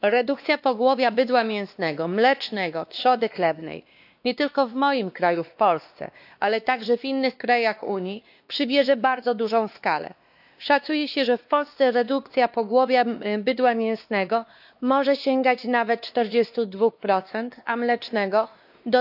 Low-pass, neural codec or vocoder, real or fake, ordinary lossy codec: 5.4 kHz; codec, 16 kHz, 2 kbps, X-Codec, WavLM features, trained on Multilingual LibriSpeech; fake; AAC, 48 kbps